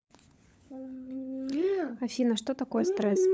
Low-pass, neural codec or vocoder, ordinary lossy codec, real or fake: none; codec, 16 kHz, 16 kbps, FunCodec, trained on LibriTTS, 50 frames a second; none; fake